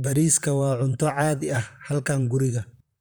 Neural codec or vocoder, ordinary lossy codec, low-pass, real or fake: vocoder, 44.1 kHz, 128 mel bands, Pupu-Vocoder; none; none; fake